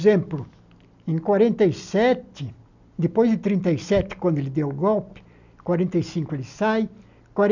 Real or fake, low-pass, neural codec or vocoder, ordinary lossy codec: real; 7.2 kHz; none; none